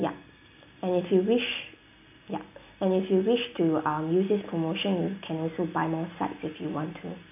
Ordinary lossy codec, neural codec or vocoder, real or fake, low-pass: AAC, 32 kbps; none; real; 3.6 kHz